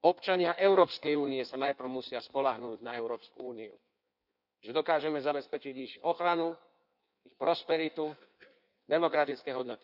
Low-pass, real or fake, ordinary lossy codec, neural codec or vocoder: 5.4 kHz; fake; none; codec, 16 kHz in and 24 kHz out, 1.1 kbps, FireRedTTS-2 codec